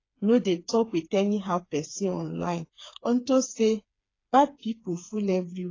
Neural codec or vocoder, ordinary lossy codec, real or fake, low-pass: codec, 16 kHz, 4 kbps, FreqCodec, smaller model; AAC, 32 kbps; fake; 7.2 kHz